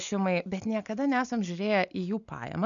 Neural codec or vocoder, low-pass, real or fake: none; 7.2 kHz; real